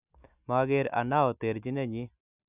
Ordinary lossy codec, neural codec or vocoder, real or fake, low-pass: none; none; real; 3.6 kHz